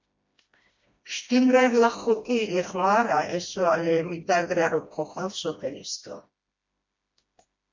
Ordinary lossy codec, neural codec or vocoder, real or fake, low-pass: MP3, 48 kbps; codec, 16 kHz, 1 kbps, FreqCodec, smaller model; fake; 7.2 kHz